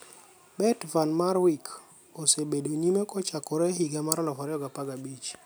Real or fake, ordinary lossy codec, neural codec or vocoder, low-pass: real; none; none; none